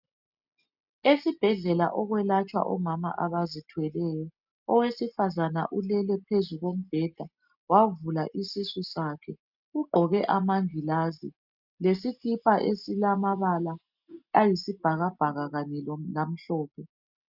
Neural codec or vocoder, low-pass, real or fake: none; 5.4 kHz; real